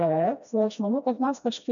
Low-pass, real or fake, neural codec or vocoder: 7.2 kHz; fake; codec, 16 kHz, 1 kbps, FreqCodec, smaller model